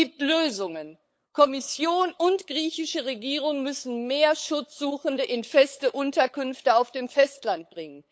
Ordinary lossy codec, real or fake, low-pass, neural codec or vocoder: none; fake; none; codec, 16 kHz, 8 kbps, FunCodec, trained on LibriTTS, 25 frames a second